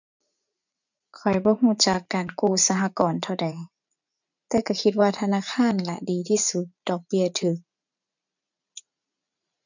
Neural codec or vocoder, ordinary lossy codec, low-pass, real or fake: vocoder, 44.1 kHz, 80 mel bands, Vocos; none; 7.2 kHz; fake